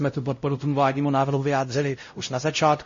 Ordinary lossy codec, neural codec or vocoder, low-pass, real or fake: MP3, 32 kbps; codec, 16 kHz, 0.5 kbps, X-Codec, WavLM features, trained on Multilingual LibriSpeech; 7.2 kHz; fake